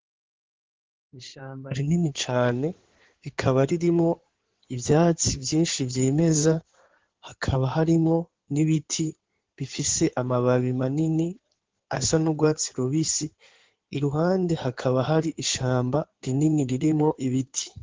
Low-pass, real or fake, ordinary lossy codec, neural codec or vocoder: 7.2 kHz; fake; Opus, 16 kbps; codec, 16 kHz in and 24 kHz out, 2.2 kbps, FireRedTTS-2 codec